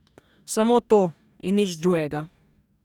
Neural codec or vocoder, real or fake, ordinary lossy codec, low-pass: codec, 44.1 kHz, 2.6 kbps, DAC; fake; none; 19.8 kHz